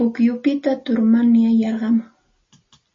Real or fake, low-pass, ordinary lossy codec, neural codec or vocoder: real; 7.2 kHz; MP3, 32 kbps; none